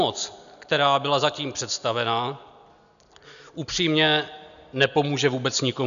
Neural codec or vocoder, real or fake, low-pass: none; real; 7.2 kHz